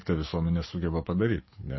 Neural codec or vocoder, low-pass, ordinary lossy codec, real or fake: codec, 44.1 kHz, 7.8 kbps, DAC; 7.2 kHz; MP3, 24 kbps; fake